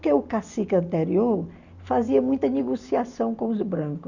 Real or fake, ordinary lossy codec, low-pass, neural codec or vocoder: real; none; 7.2 kHz; none